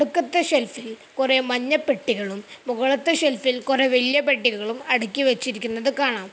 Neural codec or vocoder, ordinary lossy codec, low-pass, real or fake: none; none; none; real